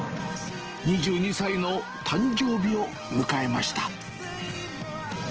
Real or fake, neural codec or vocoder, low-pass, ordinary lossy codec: real; none; 7.2 kHz; Opus, 16 kbps